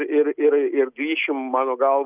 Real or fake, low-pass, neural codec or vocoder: real; 3.6 kHz; none